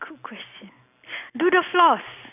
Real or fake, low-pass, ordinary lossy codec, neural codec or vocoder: real; 3.6 kHz; none; none